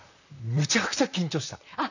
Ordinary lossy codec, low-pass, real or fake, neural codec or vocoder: none; 7.2 kHz; fake; vocoder, 44.1 kHz, 128 mel bands, Pupu-Vocoder